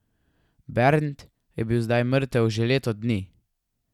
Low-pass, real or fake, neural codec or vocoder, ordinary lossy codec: 19.8 kHz; real; none; none